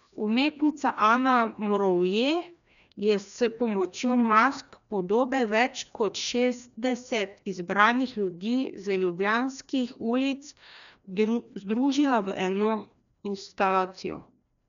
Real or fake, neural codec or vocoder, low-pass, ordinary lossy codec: fake; codec, 16 kHz, 1 kbps, FreqCodec, larger model; 7.2 kHz; none